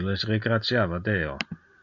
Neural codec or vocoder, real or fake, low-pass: none; real; 7.2 kHz